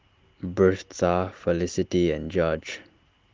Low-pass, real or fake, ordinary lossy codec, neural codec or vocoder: 7.2 kHz; real; Opus, 16 kbps; none